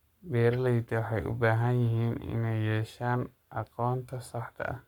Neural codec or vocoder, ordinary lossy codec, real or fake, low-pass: codec, 44.1 kHz, 7.8 kbps, Pupu-Codec; none; fake; 19.8 kHz